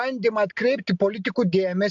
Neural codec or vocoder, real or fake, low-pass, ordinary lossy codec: none; real; 7.2 kHz; AAC, 64 kbps